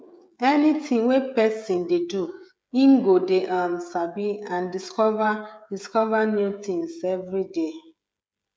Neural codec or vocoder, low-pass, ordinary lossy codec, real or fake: codec, 16 kHz, 16 kbps, FreqCodec, smaller model; none; none; fake